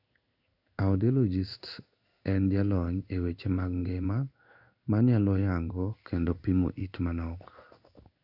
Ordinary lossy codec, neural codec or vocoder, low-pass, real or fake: none; codec, 16 kHz in and 24 kHz out, 1 kbps, XY-Tokenizer; 5.4 kHz; fake